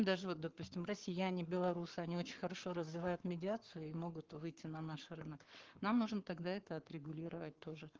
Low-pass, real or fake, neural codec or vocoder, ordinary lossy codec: 7.2 kHz; fake; codec, 44.1 kHz, 7.8 kbps, Pupu-Codec; Opus, 16 kbps